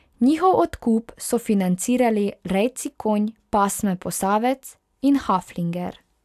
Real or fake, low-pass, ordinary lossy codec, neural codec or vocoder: real; 14.4 kHz; AAC, 96 kbps; none